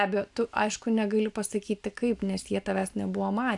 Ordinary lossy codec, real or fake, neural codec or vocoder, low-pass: AAC, 64 kbps; real; none; 10.8 kHz